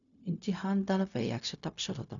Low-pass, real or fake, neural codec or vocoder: 7.2 kHz; fake; codec, 16 kHz, 0.4 kbps, LongCat-Audio-Codec